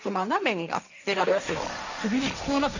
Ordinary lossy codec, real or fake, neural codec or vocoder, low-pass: none; fake; codec, 16 kHz, 1.1 kbps, Voila-Tokenizer; 7.2 kHz